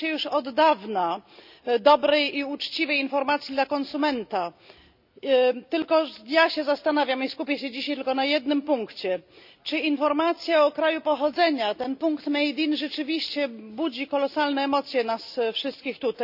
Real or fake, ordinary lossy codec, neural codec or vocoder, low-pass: real; none; none; 5.4 kHz